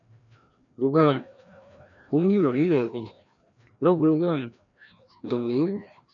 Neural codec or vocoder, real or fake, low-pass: codec, 16 kHz, 1 kbps, FreqCodec, larger model; fake; 7.2 kHz